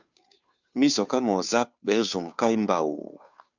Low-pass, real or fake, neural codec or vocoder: 7.2 kHz; fake; codec, 16 kHz, 2 kbps, FunCodec, trained on Chinese and English, 25 frames a second